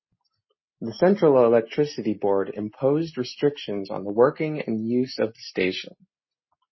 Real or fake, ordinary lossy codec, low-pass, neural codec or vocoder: real; MP3, 24 kbps; 7.2 kHz; none